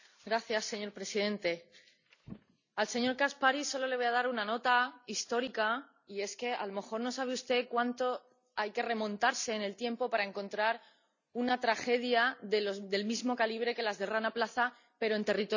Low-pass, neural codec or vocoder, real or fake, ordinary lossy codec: 7.2 kHz; none; real; none